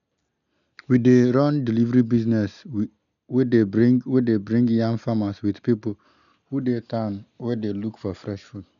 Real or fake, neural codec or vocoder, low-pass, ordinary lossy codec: real; none; 7.2 kHz; none